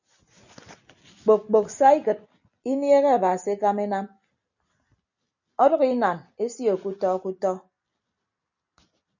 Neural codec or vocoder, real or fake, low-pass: none; real; 7.2 kHz